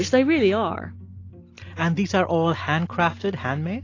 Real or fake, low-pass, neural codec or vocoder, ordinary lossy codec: real; 7.2 kHz; none; AAC, 32 kbps